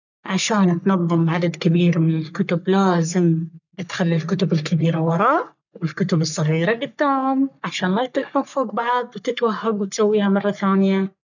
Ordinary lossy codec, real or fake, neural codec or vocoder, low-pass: none; fake; codec, 44.1 kHz, 3.4 kbps, Pupu-Codec; 7.2 kHz